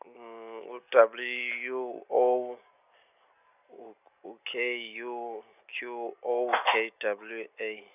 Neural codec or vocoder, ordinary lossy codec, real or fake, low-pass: none; none; real; 3.6 kHz